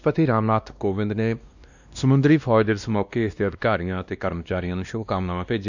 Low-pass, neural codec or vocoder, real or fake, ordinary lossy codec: 7.2 kHz; codec, 16 kHz, 1 kbps, X-Codec, WavLM features, trained on Multilingual LibriSpeech; fake; none